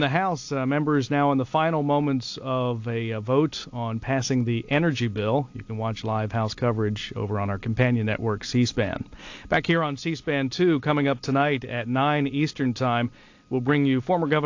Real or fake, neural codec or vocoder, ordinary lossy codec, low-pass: real; none; AAC, 48 kbps; 7.2 kHz